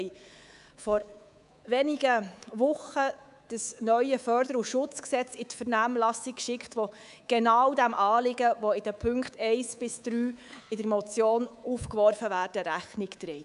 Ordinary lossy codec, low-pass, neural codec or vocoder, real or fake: none; 10.8 kHz; codec, 24 kHz, 3.1 kbps, DualCodec; fake